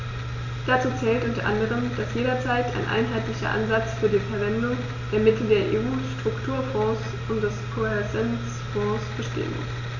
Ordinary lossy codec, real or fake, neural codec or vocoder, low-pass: none; real; none; 7.2 kHz